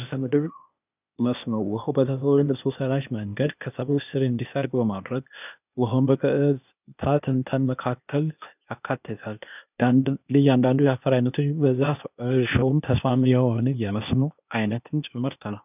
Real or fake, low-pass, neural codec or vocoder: fake; 3.6 kHz; codec, 16 kHz, 0.8 kbps, ZipCodec